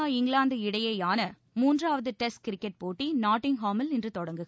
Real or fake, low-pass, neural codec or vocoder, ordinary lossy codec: real; none; none; none